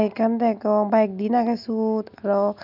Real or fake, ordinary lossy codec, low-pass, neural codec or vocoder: real; none; 5.4 kHz; none